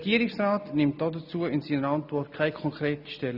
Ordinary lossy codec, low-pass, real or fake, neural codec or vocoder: none; 5.4 kHz; real; none